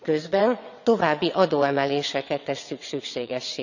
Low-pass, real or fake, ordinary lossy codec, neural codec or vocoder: 7.2 kHz; fake; none; vocoder, 22.05 kHz, 80 mel bands, WaveNeXt